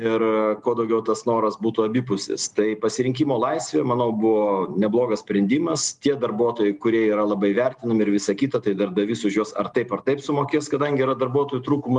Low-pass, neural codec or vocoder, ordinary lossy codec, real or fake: 10.8 kHz; none; Opus, 32 kbps; real